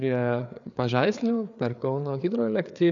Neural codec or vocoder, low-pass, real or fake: codec, 16 kHz, 4 kbps, FreqCodec, larger model; 7.2 kHz; fake